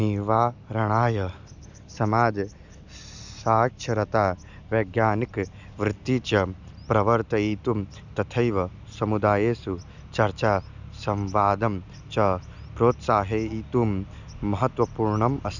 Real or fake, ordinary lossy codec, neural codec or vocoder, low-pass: real; none; none; 7.2 kHz